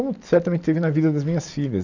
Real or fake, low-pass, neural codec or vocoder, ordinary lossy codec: real; 7.2 kHz; none; none